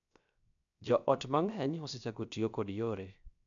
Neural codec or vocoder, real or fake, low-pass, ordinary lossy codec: codec, 16 kHz, 0.7 kbps, FocalCodec; fake; 7.2 kHz; none